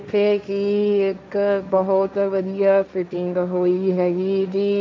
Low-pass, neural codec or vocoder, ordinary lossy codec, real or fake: none; codec, 16 kHz, 1.1 kbps, Voila-Tokenizer; none; fake